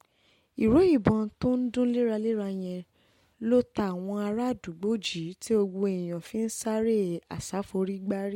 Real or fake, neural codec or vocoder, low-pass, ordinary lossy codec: real; none; 19.8 kHz; MP3, 64 kbps